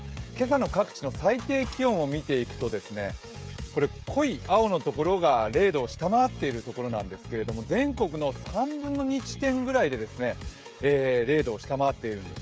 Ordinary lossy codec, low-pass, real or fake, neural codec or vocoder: none; none; fake; codec, 16 kHz, 16 kbps, FreqCodec, smaller model